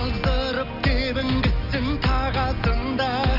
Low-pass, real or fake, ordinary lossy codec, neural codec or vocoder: 5.4 kHz; real; none; none